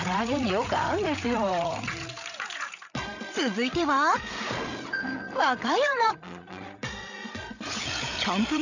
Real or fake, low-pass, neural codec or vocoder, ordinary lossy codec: fake; 7.2 kHz; codec, 16 kHz, 8 kbps, FreqCodec, larger model; none